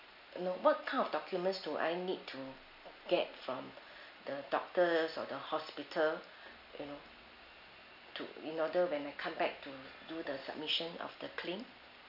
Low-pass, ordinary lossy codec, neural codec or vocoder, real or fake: 5.4 kHz; none; none; real